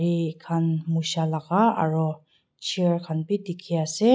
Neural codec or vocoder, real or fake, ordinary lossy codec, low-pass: none; real; none; none